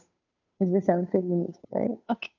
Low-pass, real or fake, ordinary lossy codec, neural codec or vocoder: 7.2 kHz; fake; none; codec, 16 kHz, 2 kbps, FunCodec, trained on Chinese and English, 25 frames a second